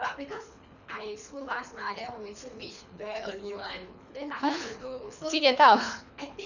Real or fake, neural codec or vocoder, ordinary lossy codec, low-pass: fake; codec, 24 kHz, 3 kbps, HILCodec; none; 7.2 kHz